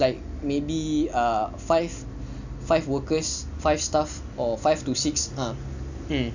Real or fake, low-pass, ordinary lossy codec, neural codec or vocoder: real; 7.2 kHz; none; none